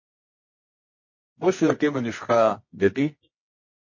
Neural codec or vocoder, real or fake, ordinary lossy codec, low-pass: codec, 24 kHz, 0.9 kbps, WavTokenizer, medium music audio release; fake; MP3, 32 kbps; 7.2 kHz